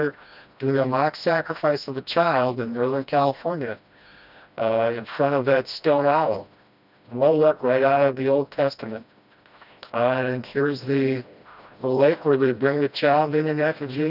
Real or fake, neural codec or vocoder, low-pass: fake; codec, 16 kHz, 1 kbps, FreqCodec, smaller model; 5.4 kHz